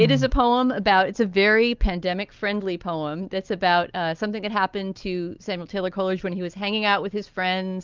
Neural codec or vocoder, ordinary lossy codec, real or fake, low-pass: none; Opus, 24 kbps; real; 7.2 kHz